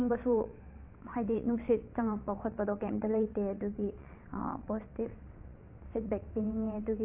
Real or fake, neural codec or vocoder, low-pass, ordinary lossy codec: fake; vocoder, 22.05 kHz, 80 mel bands, WaveNeXt; 3.6 kHz; AAC, 24 kbps